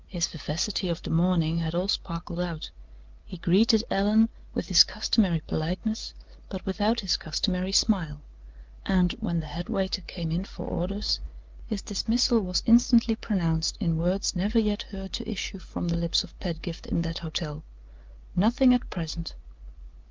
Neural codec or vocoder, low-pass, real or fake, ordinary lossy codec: none; 7.2 kHz; real; Opus, 24 kbps